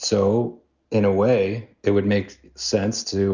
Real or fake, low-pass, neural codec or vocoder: real; 7.2 kHz; none